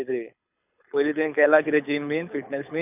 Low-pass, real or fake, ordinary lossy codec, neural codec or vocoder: 3.6 kHz; fake; none; codec, 24 kHz, 6 kbps, HILCodec